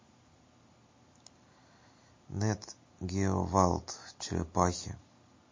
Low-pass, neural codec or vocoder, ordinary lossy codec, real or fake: 7.2 kHz; none; MP3, 32 kbps; real